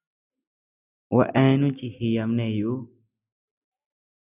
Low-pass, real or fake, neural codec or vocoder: 3.6 kHz; real; none